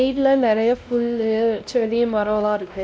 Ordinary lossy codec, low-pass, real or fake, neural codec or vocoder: none; none; fake; codec, 16 kHz, 1 kbps, X-Codec, WavLM features, trained on Multilingual LibriSpeech